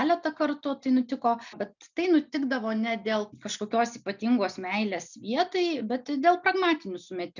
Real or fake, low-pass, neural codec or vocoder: real; 7.2 kHz; none